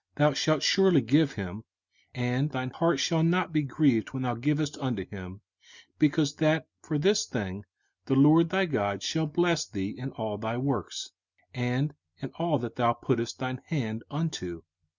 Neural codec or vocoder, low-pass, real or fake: none; 7.2 kHz; real